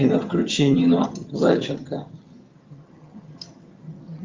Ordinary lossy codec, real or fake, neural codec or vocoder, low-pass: Opus, 32 kbps; fake; vocoder, 22.05 kHz, 80 mel bands, HiFi-GAN; 7.2 kHz